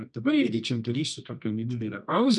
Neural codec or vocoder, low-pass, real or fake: codec, 24 kHz, 0.9 kbps, WavTokenizer, medium music audio release; 10.8 kHz; fake